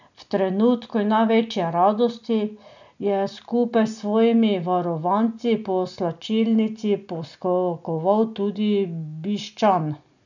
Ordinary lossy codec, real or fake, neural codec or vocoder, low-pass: none; real; none; 7.2 kHz